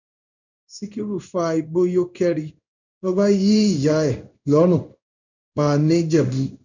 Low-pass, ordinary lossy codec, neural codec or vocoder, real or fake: 7.2 kHz; none; codec, 16 kHz in and 24 kHz out, 1 kbps, XY-Tokenizer; fake